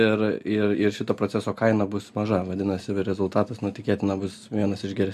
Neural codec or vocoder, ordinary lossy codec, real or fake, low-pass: none; MP3, 64 kbps; real; 14.4 kHz